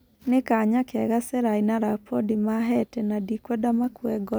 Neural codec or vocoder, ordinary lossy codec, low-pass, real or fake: none; none; none; real